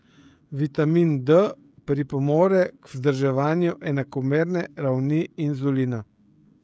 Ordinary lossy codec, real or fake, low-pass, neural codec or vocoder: none; fake; none; codec, 16 kHz, 16 kbps, FreqCodec, smaller model